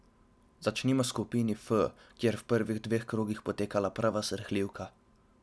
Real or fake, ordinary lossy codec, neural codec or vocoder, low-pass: real; none; none; none